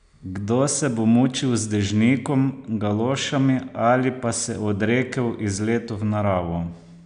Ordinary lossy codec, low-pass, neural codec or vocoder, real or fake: AAC, 96 kbps; 9.9 kHz; none; real